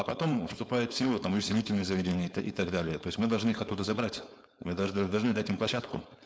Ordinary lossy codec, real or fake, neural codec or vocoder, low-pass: none; fake; codec, 16 kHz, 4.8 kbps, FACodec; none